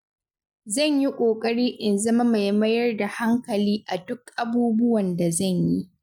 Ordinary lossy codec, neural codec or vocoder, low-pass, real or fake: none; none; 19.8 kHz; real